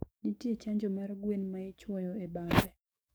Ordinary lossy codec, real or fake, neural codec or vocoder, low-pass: none; fake; codec, 44.1 kHz, 7.8 kbps, DAC; none